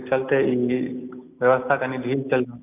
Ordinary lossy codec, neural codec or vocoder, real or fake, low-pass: none; none; real; 3.6 kHz